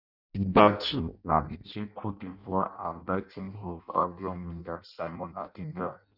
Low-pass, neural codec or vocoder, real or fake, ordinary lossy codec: 5.4 kHz; codec, 16 kHz in and 24 kHz out, 0.6 kbps, FireRedTTS-2 codec; fake; none